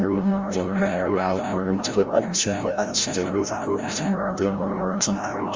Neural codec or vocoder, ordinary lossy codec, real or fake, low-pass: codec, 16 kHz, 0.5 kbps, FreqCodec, larger model; Opus, 32 kbps; fake; 7.2 kHz